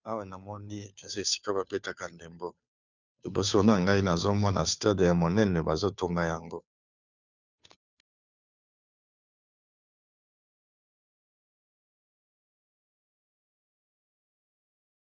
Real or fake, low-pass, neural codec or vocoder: fake; 7.2 kHz; codec, 16 kHz, 2 kbps, FunCodec, trained on Chinese and English, 25 frames a second